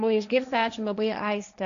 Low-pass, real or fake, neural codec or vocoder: 7.2 kHz; fake; codec, 16 kHz, 1.1 kbps, Voila-Tokenizer